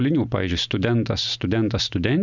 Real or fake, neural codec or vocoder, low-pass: real; none; 7.2 kHz